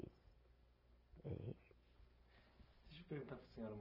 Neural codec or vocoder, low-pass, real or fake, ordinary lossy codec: none; 5.4 kHz; real; none